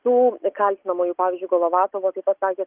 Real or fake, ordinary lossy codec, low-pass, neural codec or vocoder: real; Opus, 32 kbps; 3.6 kHz; none